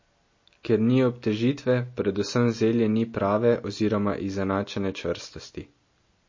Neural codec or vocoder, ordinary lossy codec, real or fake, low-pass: none; MP3, 32 kbps; real; 7.2 kHz